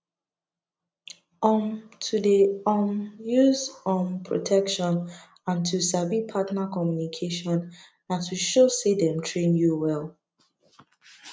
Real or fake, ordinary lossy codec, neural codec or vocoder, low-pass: real; none; none; none